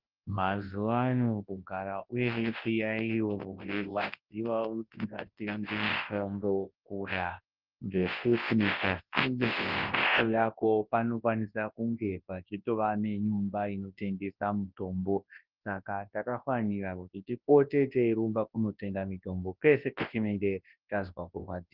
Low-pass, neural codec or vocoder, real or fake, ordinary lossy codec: 5.4 kHz; codec, 24 kHz, 0.9 kbps, WavTokenizer, large speech release; fake; Opus, 16 kbps